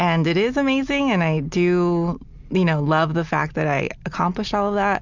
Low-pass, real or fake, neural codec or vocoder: 7.2 kHz; real; none